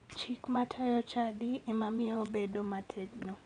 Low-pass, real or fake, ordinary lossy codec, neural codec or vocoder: 9.9 kHz; fake; none; vocoder, 22.05 kHz, 80 mel bands, WaveNeXt